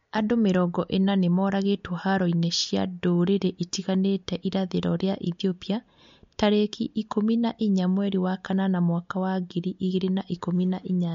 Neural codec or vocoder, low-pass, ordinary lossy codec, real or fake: none; 7.2 kHz; MP3, 48 kbps; real